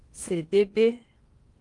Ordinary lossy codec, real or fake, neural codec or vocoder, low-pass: Opus, 32 kbps; fake; codec, 16 kHz in and 24 kHz out, 0.8 kbps, FocalCodec, streaming, 65536 codes; 10.8 kHz